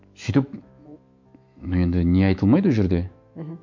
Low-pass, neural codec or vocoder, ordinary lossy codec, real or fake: 7.2 kHz; autoencoder, 48 kHz, 128 numbers a frame, DAC-VAE, trained on Japanese speech; MP3, 48 kbps; fake